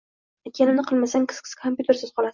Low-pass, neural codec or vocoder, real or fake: 7.2 kHz; none; real